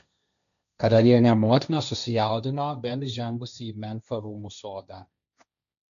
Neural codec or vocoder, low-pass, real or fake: codec, 16 kHz, 1.1 kbps, Voila-Tokenizer; 7.2 kHz; fake